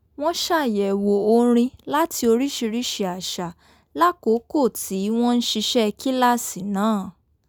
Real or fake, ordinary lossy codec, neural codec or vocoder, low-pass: real; none; none; none